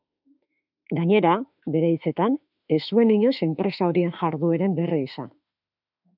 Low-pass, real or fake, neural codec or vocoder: 5.4 kHz; fake; autoencoder, 48 kHz, 32 numbers a frame, DAC-VAE, trained on Japanese speech